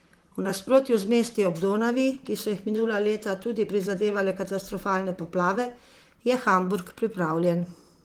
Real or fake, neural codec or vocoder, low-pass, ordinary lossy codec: fake; vocoder, 44.1 kHz, 128 mel bands, Pupu-Vocoder; 19.8 kHz; Opus, 24 kbps